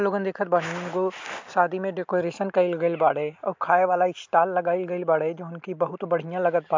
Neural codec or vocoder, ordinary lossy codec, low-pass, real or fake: none; MP3, 64 kbps; 7.2 kHz; real